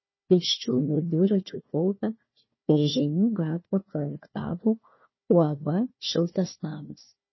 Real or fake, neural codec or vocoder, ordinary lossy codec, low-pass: fake; codec, 16 kHz, 1 kbps, FunCodec, trained on Chinese and English, 50 frames a second; MP3, 24 kbps; 7.2 kHz